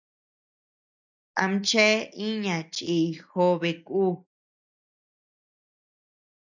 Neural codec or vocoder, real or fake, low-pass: none; real; 7.2 kHz